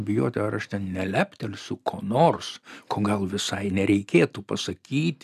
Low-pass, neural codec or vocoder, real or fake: 14.4 kHz; none; real